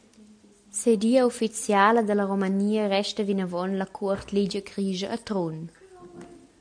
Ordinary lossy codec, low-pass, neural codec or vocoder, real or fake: MP3, 64 kbps; 9.9 kHz; none; real